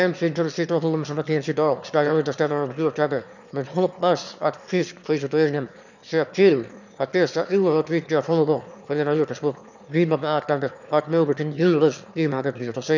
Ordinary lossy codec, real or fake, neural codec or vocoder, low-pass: none; fake; autoencoder, 22.05 kHz, a latent of 192 numbers a frame, VITS, trained on one speaker; 7.2 kHz